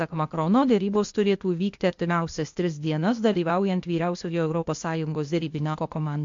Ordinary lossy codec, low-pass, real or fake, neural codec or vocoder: MP3, 48 kbps; 7.2 kHz; fake; codec, 16 kHz, 0.8 kbps, ZipCodec